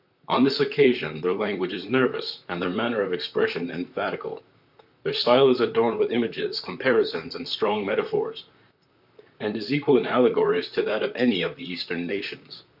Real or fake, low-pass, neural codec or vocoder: fake; 5.4 kHz; vocoder, 44.1 kHz, 128 mel bands, Pupu-Vocoder